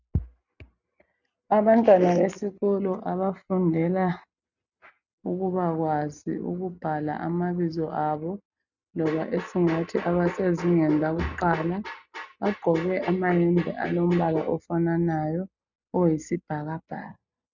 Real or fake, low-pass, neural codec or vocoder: real; 7.2 kHz; none